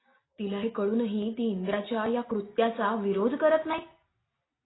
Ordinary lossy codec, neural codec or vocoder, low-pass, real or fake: AAC, 16 kbps; none; 7.2 kHz; real